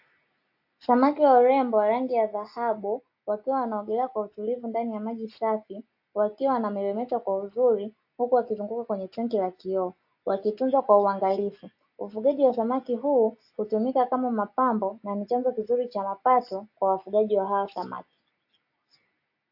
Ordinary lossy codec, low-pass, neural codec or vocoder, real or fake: AAC, 48 kbps; 5.4 kHz; none; real